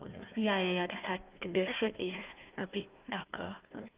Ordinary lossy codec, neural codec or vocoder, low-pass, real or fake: Opus, 24 kbps; codec, 16 kHz, 1 kbps, FunCodec, trained on Chinese and English, 50 frames a second; 3.6 kHz; fake